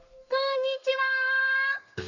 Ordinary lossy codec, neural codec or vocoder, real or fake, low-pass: none; codec, 32 kHz, 1.9 kbps, SNAC; fake; 7.2 kHz